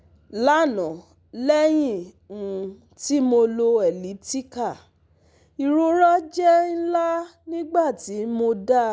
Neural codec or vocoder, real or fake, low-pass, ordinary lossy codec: none; real; none; none